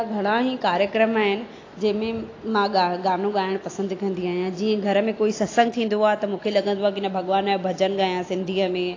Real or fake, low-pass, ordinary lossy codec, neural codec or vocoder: real; 7.2 kHz; AAC, 32 kbps; none